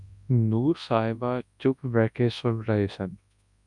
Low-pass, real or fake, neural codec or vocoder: 10.8 kHz; fake; codec, 24 kHz, 0.9 kbps, WavTokenizer, large speech release